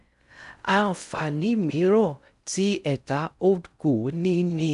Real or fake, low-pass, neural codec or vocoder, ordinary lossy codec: fake; 10.8 kHz; codec, 16 kHz in and 24 kHz out, 0.6 kbps, FocalCodec, streaming, 4096 codes; none